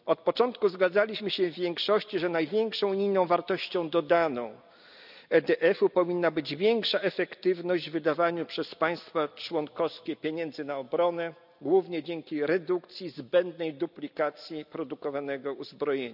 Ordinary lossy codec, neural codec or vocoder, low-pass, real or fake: none; none; 5.4 kHz; real